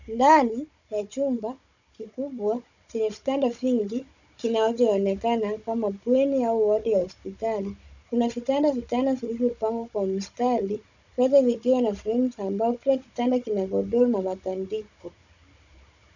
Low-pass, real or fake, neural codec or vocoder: 7.2 kHz; fake; codec, 16 kHz, 16 kbps, FunCodec, trained on Chinese and English, 50 frames a second